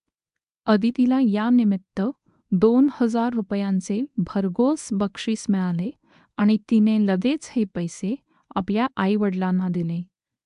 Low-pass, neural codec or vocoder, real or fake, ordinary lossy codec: 10.8 kHz; codec, 24 kHz, 0.9 kbps, WavTokenizer, medium speech release version 1; fake; AAC, 96 kbps